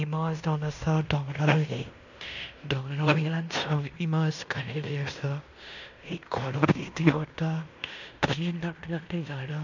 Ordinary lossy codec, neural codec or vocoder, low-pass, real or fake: none; codec, 16 kHz in and 24 kHz out, 0.9 kbps, LongCat-Audio-Codec, fine tuned four codebook decoder; 7.2 kHz; fake